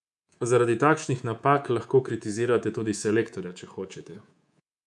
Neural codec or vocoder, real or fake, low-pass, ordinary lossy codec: codec, 24 kHz, 3.1 kbps, DualCodec; fake; none; none